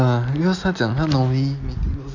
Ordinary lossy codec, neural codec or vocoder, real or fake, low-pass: none; none; real; 7.2 kHz